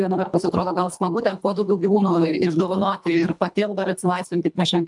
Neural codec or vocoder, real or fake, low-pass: codec, 24 kHz, 1.5 kbps, HILCodec; fake; 10.8 kHz